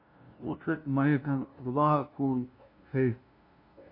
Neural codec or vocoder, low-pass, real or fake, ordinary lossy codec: codec, 16 kHz, 0.5 kbps, FunCodec, trained on LibriTTS, 25 frames a second; 5.4 kHz; fake; AAC, 32 kbps